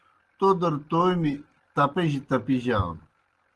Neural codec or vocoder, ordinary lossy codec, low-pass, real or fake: none; Opus, 16 kbps; 10.8 kHz; real